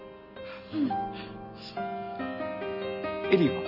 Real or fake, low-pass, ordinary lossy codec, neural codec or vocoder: real; 5.4 kHz; none; none